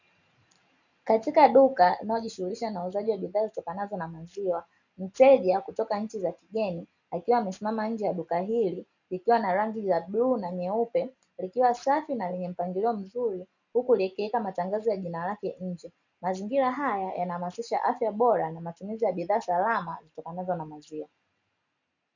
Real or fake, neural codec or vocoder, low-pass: real; none; 7.2 kHz